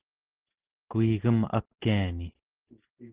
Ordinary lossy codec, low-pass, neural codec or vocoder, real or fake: Opus, 16 kbps; 3.6 kHz; none; real